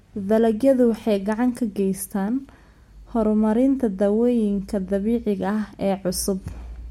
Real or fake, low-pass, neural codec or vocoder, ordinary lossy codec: real; 19.8 kHz; none; MP3, 64 kbps